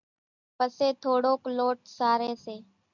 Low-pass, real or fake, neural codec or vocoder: 7.2 kHz; real; none